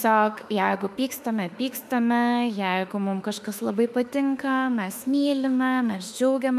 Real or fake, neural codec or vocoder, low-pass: fake; autoencoder, 48 kHz, 32 numbers a frame, DAC-VAE, trained on Japanese speech; 14.4 kHz